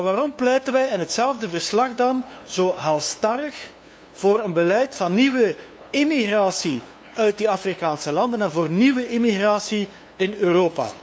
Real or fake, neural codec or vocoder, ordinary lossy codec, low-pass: fake; codec, 16 kHz, 2 kbps, FunCodec, trained on LibriTTS, 25 frames a second; none; none